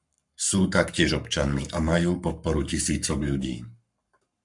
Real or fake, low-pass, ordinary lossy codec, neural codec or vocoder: fake; 10.8 kHz; MP3, 96 kbps; codec, 44.1 kHz, 7.8 kbps, Pupu-Codec